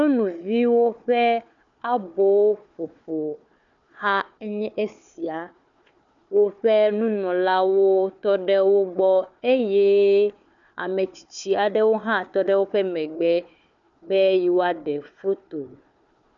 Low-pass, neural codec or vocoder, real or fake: 7.2 kHz; codec, 16 kHz, 4 kbps, FunCodec, trained on Chinese and English, 50 frames a second; fake